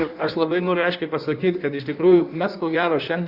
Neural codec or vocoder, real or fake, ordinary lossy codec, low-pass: codec, 16 kHz in and 24 kHz out, 1.1 kbps, FireRedTTS-2 codec; fake; Opus, 64 kbps; 5.4 kHz